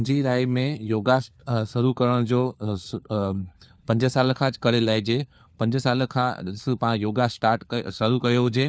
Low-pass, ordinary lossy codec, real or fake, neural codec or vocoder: none; none; fake; codec, 16 kHz, 4 kbps, FunCodec, trained on LibriTTS, 50 frames a second